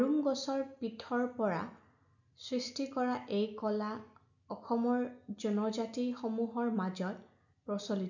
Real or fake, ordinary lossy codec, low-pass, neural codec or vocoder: real; none; 7.2 kHz; none